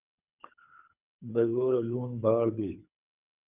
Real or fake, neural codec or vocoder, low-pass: fake; codec, 24 kHz, 3 kbps, HILCodec; 3.6 kHz